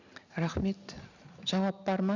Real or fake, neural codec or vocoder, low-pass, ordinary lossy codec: real; none; 7.2 kHz; none